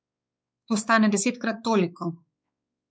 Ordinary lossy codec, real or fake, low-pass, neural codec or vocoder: none; fake; none; codec, 16 kHz, 4 kbps, X-Codec, WavLM features, trained on Multilingual LibriSpeech